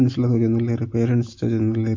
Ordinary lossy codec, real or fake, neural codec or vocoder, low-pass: MP3, 48 kbps; real; none; 7.2 kHz